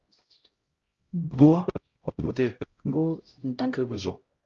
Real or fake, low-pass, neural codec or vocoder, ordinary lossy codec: fake; 7.2 kHz; codec, 16 kHz, 0.5 kbps, X-Codec, HuBERT features, trained on LibriSpeech; Opus, 32 kbps